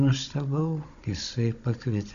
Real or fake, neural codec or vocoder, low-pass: fake; codec, 16 kHz, 16 kbps, FunCodec, trained on Chinese and English, 50 frames a second; 7.2 kHz